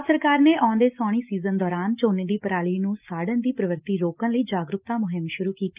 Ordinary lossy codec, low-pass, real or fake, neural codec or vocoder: Opus, 24 kbps; 3.6 kHz; real; none